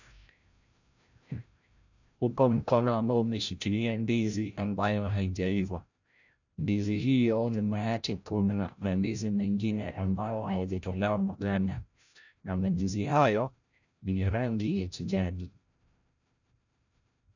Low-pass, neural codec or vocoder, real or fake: 7.2 kHz; codec, 16 kHz, 0.5 kbps, FreqCodec, larger model; fake